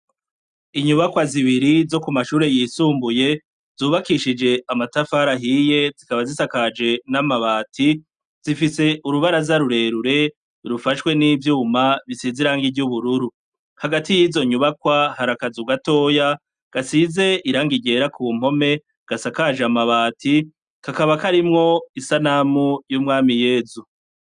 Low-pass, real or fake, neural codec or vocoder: 9.9 kHz; real; none